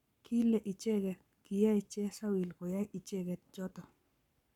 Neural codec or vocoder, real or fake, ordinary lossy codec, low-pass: codec, 44.1 kHz, 7.8 kbps, Pupu-Codec; fake; none; 19.8 kHz